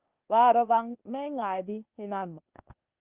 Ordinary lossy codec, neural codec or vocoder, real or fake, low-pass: Opus, 32 kbps; codec, 16 kHz, 0.8 kbps, ZipCodec; fake; 3.6 kHz